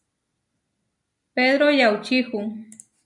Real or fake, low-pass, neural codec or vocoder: real; 10.8 kHz; none